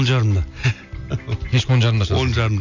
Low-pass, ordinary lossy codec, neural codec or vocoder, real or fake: 7.2 kHz; none; none; real